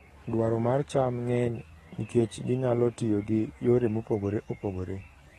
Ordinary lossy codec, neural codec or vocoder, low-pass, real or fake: AAC, 32 kbps; codec, 44.1 kHz, 7.8 kbps, DAC; 19.8 kHz; fake